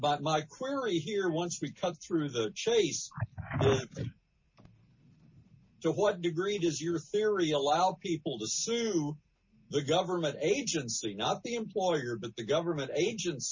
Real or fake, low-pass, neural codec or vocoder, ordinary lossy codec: real; 7.2 kHz; none; MP3, 32 kbps